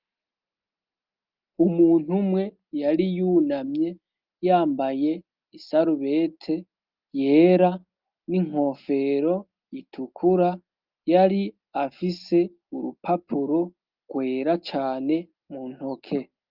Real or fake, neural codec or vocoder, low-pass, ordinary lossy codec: real; none; 5.4 kHz; Opus, 32 kbps